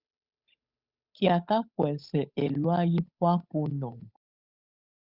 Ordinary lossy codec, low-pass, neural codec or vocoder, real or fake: Opus, 64 kbps; 5.4 kHz; codec, 16 kHz, 8 kbps, FunCodec, trained on Chinese and English, 25 frames a second; fake